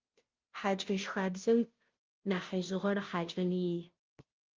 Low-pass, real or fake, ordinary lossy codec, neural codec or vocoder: 7.2 kHz; fake; Opus, 24 kbps; codec, 16 kHz, 0.5 kbps, FunCodec, trained on Chinese and English, 25 frames a second